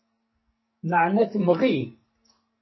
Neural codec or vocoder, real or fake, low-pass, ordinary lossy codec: codec, 44.1 kHz, 2.6 kbps, SNAC; fake; 7.2 kHz; MP3, 24 kbps